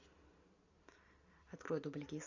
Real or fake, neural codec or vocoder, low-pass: real; none; 7.2 kHz